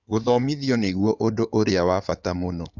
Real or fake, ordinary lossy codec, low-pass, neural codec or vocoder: fake; none; 7.2 kHz; codec, 16 kHz in and 24 kHz out, 2.2 kbps, FireRedTTS-2 codec